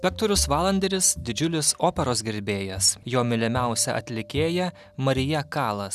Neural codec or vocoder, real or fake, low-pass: none; real; 14.4 kHz